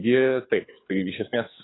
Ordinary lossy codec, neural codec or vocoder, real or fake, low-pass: AAC, 16 kbps; codec, 24 kHz, 6 kbps, HILCodec; fake; 7.2 kHz